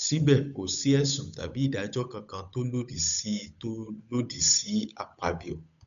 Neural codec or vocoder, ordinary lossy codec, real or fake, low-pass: codec, 16 kHz, 8 kbps, FunCodec, trained on Chinese and English, 25 frames a second; none; fake; 7.2 kHz